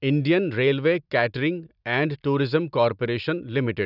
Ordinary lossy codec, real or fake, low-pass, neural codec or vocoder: none; real; 5.4 kHz; none